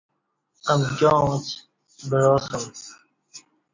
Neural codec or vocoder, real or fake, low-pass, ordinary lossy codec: none; real; 7.2 kHz; MP3, 64 kbps